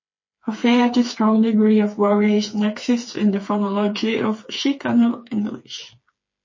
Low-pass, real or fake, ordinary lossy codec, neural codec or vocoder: 7.2 kHz; fake; MP3, 32 kbps; codec, 16 kHz, 4 kbps, FreqCodec, smaller model